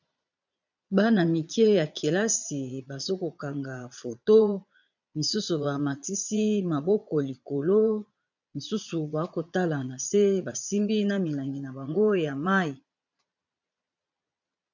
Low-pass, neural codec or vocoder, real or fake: 7.2 kHz; vocoder, 22.05 kHz, 80 mel bands, Vocos; fake